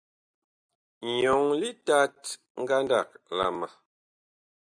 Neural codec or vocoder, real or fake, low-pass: none; real; 9.9 kHz